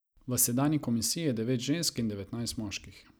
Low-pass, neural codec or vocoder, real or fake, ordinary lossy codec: none; none; real; none